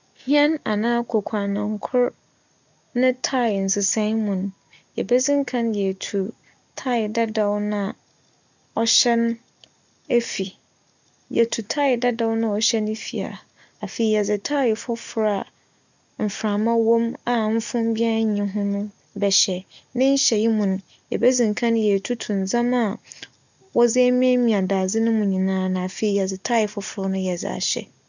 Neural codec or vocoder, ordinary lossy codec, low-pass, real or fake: none; none; 7.2 kHz; real